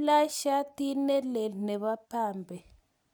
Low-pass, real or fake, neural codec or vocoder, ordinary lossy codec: none; real; none; none